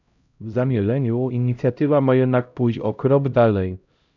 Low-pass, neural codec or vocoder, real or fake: 7.2 kHz; codec, 16 kHz, 0.5 kbps, X-Codec, HuBERT features, trained on LibriSpeech; fake